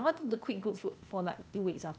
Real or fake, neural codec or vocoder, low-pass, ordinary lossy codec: fake; codec, 16 kHz, 0.8 kbps, ZipCodec; none; none